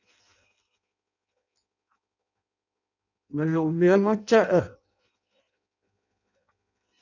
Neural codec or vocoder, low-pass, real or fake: codec, 16 kHz in and 24 kHz out, 0.6 kbps, FireRedTTS-2 codec; 7.2 kHz; fake